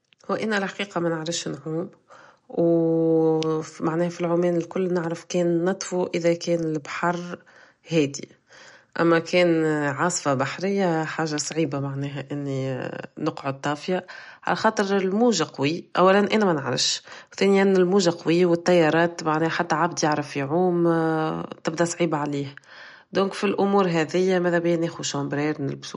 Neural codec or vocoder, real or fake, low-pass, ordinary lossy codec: none; real; 19.8 kHz; MP3, 48 kbps